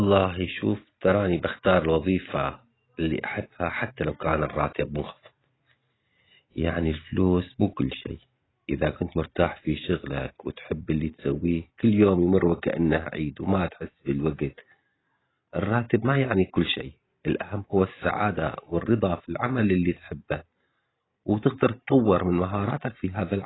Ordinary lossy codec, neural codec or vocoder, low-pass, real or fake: AAC, 16 kbps; none; 7.2 kHz; real